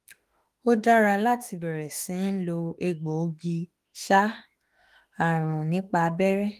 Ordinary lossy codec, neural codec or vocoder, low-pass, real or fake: Opus, 24 kbps; autoencoder, 48 kHz, 32 numbers a frame, DAC-VAE, trained on Japanese speech; 14.4 kHz; fake